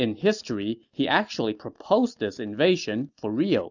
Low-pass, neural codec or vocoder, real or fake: 7.2 kHz; none; real